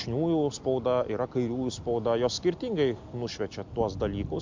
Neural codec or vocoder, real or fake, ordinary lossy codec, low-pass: none; real; MP3, 64 kbps; 7.2 kHz